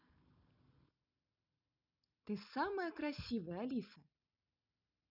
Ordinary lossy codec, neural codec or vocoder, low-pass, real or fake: none; none; 5.4 kHz; real